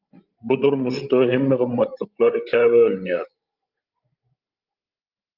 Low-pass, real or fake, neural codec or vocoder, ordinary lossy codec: 5.4 kHz; fake; codec, 16 kHz, 16 kbps, FreqCodec, larger model; Opus, 24 kbps